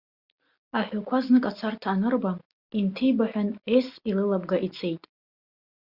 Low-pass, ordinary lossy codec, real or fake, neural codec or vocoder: 5.4 kHz; Opus, 64 kbps; real; none